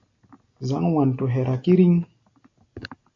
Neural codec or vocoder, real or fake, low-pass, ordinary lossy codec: none; real; 7.2 kHz; AAC, 48 kbps